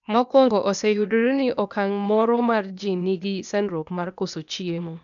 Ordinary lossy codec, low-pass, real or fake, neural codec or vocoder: none; 7.2 kHz; fake; codec, 16 kHz, 0.8 kbps, ZipCodec